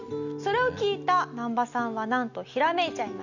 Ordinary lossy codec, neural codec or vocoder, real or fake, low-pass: none; none; real; 7.2 kHz